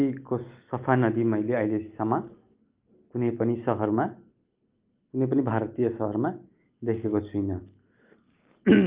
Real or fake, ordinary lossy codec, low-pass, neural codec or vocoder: real; Opus, 32 kbps; 3.6 kHz; none